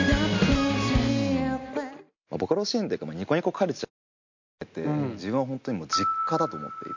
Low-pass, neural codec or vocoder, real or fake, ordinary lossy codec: 7.2 kHz; none; real; MP3, 48 kbps